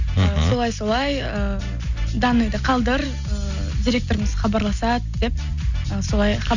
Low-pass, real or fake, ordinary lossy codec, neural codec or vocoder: 7.2 kHz; real; none; none